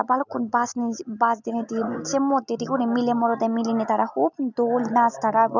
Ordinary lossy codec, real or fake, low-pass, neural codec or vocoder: none; real; 7.2 kHz; none